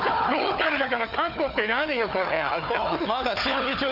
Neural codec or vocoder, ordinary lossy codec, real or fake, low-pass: codec, 16 kHz, 4 kbps, FunCodec, trained on Chinese and English, 50 frames a second; none; fake; 5.4 kHz